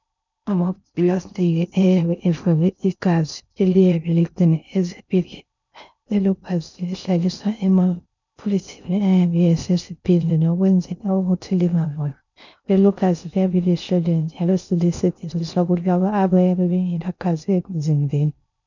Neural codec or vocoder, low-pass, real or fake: codec, 16 kHz in and 24 kHz out, 0.6 kbps, FocalCodec, streaming, 4096 codes; 7.2 kHz; fake